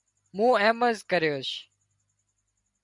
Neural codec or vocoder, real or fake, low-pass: none; real; 10.8 kHz